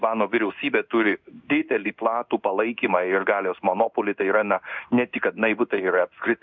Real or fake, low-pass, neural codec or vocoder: fake; 7.2 kHz; codec, 16 kHz in and 24 kHz out, 1 kbps, XY-Tokenizer